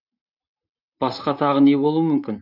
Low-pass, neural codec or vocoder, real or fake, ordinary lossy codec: 5.4 kHz; vocoder, 44.1 kHz, 128 mel bands, Pupu-Vocoder; fake; none